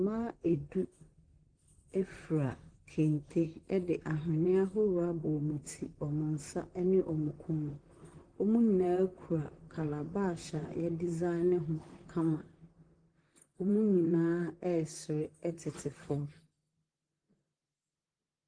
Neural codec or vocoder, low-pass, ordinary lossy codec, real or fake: vocoder, 44.1 kHz, 128 mel bands, Pupu-Vocoder; 9.9 kHz; Opus, 24 kbps; fake